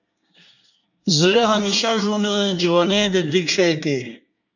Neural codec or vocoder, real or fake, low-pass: codec, 24 kHz, 1 kbps, SNAC; fake; 7.2 kHz